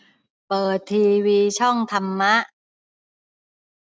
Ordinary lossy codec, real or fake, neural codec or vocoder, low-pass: none; real; none; none